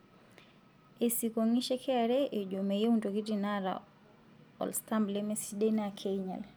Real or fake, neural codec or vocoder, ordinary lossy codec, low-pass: real; none; none; none